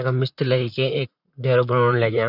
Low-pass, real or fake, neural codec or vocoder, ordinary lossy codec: 5.4 kHz; fake; vocoder, 44.1 kHz, 128 mel bands, Pupu-Vocoder; none